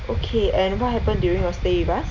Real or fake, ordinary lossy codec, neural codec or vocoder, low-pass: real; none; none; 7.2 kHz